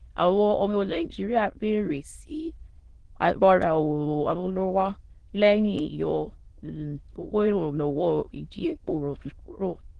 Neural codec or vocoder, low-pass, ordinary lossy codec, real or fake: autoencoder, 22.05 kHz, a latent of 192 numbers a frame, VITS, trained on many speakers; 9.9 kHz; Opus, 16 kbps; fake